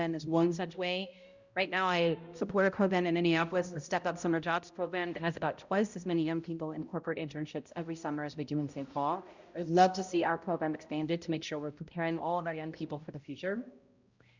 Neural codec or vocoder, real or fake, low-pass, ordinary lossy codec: codec, 16 kHz, 0.5 kbps, X-Codec, HuBERT features, trained on balanced general audio; fake; 7.2 kHz; Opus, 64 kbps